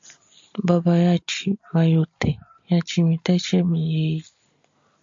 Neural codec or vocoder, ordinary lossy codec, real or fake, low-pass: none; AAC, 64 kbps; real; 7.2 kHz